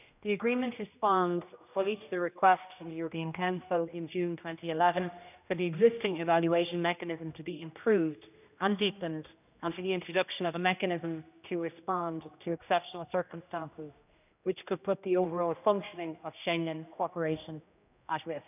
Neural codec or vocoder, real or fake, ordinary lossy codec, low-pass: codec, 16 kHz, 1 kbps, X-Codec, HuBERT features, trained on general audio; fake; none; 3.6 kHz